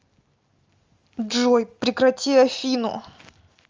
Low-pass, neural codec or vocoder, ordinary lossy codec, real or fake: 7.2 kHz; none; Opus, 32 kbps; real